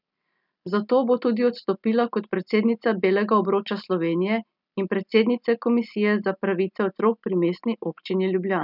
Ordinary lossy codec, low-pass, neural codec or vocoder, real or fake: none; 5.4 kHz; none; real